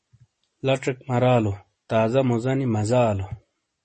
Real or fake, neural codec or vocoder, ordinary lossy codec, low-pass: real; none; MP3, 32 kbps; 10.8 kHz